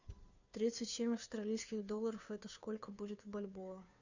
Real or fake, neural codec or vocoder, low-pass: fake; codec, 16 kHz, 2 kbps, FunCodec, trained on Chinese and English, 25 frames a second; 7.2 kHz